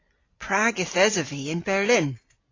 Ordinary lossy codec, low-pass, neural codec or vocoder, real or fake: AAC, 32 kbps; 7.2 kHz; none; real